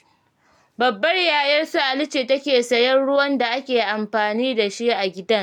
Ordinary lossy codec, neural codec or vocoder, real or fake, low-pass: none; none; real; 19.8 kHz